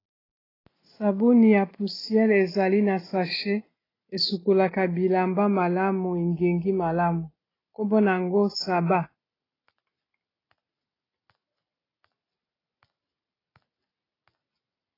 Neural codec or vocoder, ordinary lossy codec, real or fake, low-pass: none; AAC, 24 kbps; real; 5.4 kHz